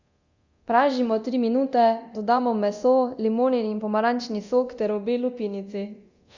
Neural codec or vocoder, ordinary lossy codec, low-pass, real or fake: codec, 24 kHz, 0.9 kbps, DualCodec; Opus, 64 kbps; 7.2 kHz; fake